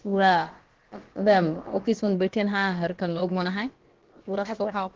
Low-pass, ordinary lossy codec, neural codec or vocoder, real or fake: 7.2 kHz; Opus, 16 kbps; codec, 16 kHz, about 1 kbps, DyCAST, with the encoder's durations; fake